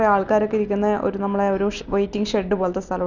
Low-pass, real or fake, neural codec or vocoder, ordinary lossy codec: 7.2 kHz; real; none; Opus, 64 kbps